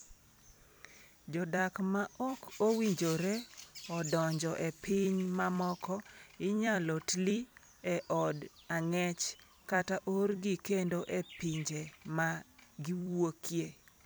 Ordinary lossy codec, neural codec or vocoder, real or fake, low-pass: none; vocoder, 44.1 kHz, 128 mel bands every 256 samples, BigVGAN v2; fake; none